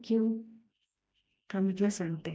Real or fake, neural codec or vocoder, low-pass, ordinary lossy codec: fake; codec, 16 kHz, 1 kbps, FreqCodec, smaller model; none; none